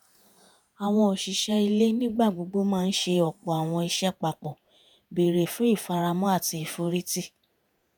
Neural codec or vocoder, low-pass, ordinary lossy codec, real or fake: vocoder, 48 kHz, 128 mel bands, Vocos; none; none; fake